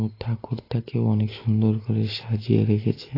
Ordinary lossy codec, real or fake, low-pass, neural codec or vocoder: AAC, 24 kbps; real; 5.4 kHz; none